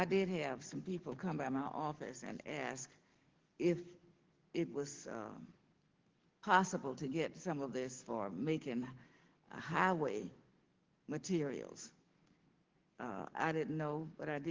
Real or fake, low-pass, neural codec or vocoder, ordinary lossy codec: fake; 7.2 kHz; codec, 44.1 kHz, 7.8 kbps, DAC; Opus, 16 kbps